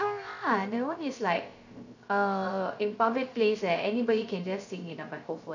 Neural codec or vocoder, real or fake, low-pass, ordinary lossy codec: codec, 16 kHz, 0.3 kbps, FocalCodec; fake; 7.2 kHz; none